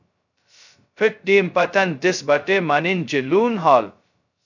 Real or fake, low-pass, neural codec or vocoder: fake; 7.2 kHz; codec, 16 kHz, 0.2 kbps, FocalCodec